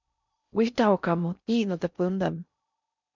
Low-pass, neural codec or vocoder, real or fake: 7.2 kHz; codec, 16 kHz in and 24 kHz out, 0.6 kbps, FocalCodec, streaming, 4096 codes; fake